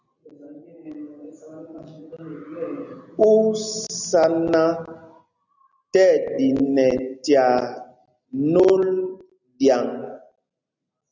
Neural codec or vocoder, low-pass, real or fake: none; 7.2 kHz; real